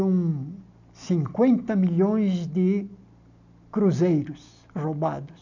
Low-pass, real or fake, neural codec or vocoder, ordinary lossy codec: 7.2 kHz; real; none; none